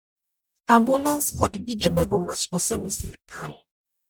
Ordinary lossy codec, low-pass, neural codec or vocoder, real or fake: none; none; codec, 44.1 kHz, 0.9 kbps, DAC; fake